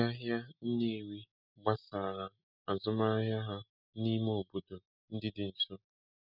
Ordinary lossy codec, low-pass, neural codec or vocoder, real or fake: none; 5.4 kHz; none; real